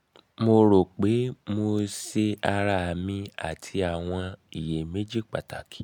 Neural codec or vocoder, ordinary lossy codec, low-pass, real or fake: none; none; 19.8 kHz; real